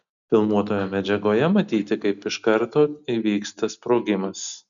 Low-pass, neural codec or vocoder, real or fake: 7.2 kHz; none; real